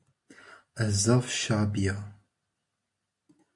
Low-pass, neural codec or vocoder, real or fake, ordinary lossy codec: 10.8 kHz; none; real; MP3, 48 kbps